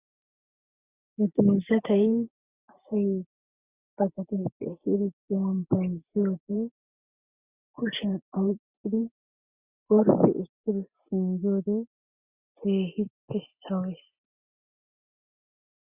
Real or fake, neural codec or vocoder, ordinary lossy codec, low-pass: real; none; Opus, 64 kbps; 3.6 kHz